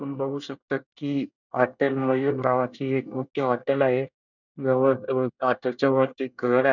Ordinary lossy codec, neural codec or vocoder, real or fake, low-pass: none; codec, 24 kHz, 1 kbps, SNAC; fake; 7.2 kHz